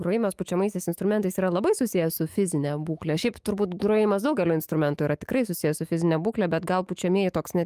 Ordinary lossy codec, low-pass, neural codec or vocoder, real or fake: Opus, 32 kbps; 14.4 kHz; autoencoder, 48 kHz, 128 numbers a frame, DAC-VAE, trained on Japanese speech; fake